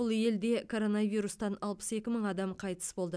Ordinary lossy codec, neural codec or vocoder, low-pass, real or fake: none; none; none; real